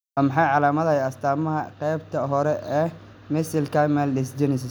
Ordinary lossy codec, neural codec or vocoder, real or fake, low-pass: none; none; real; none